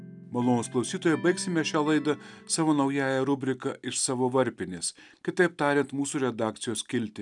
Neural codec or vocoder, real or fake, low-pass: none; real; 10.8 kHz